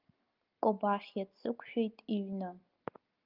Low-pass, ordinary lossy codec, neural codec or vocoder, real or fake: 5.4 kHz; Opus, 24 kbps; none; real